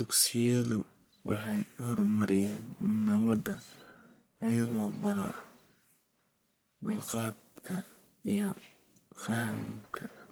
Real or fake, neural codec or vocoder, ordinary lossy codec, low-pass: fake; codec, 44.1 kHz, 1.7 kbps, Pupu-Codec; none; none